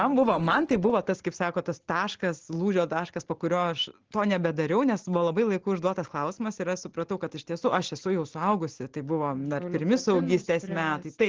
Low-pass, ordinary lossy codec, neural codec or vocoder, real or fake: 7.2 kHz; Opus, 16 kbps; none; real